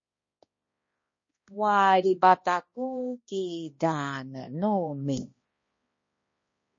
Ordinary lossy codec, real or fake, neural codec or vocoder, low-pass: MP3, 32 kbps; fake; codec, 16 kHz, 1 kbps, X-Codec, HuBERT features, trained on balanced general audio; 7.2 kHz